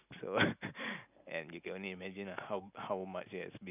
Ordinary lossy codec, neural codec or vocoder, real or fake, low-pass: none; none; real; 3.6 kHz